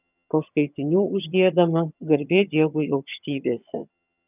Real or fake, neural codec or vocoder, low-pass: fake; vocoder, 22.05 kHz, 80 mel bands, HiFi-GAN; 3.6 kHz